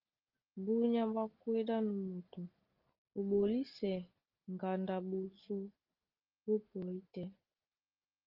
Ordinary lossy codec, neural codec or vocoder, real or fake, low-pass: Opus, 24 kbps; none; real; 5.4 kHz